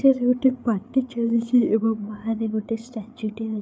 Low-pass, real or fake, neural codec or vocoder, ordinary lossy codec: none; fake; codec, 16 kHz, 8 kbps, FreqCodec, larger model; none